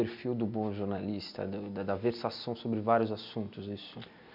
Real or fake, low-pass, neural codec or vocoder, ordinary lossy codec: real; 5.4 kHz; none; MP3, 48 kbps